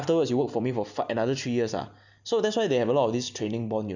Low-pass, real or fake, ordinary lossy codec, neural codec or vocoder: 7.2 kHz; real; none; none